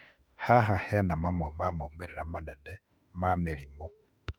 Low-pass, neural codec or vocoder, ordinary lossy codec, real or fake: 19.8 kHz; autoencoder, 48 kHz, 32 numbers a frame, DAC-VAE, trained on Japanese speech; none; fake